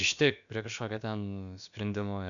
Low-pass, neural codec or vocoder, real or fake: 7.2 kHz; codec, 16 kHz, about 1 kbps, DyCAST, with the encoder's durations; fake